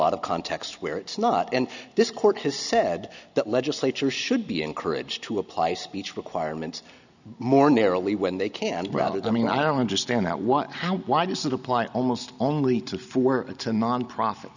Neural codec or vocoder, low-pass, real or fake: none; 7.2 kHz; real